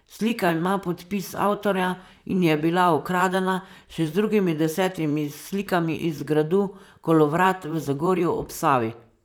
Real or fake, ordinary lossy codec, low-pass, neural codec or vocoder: fake; none; none; vocoder, 44.1 kHz, 128 mel bands, Pupu-Vocoder